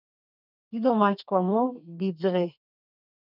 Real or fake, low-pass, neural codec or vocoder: fake; 5.4 kHz; codec, 32 kHz, 1.9 kbps, SNAC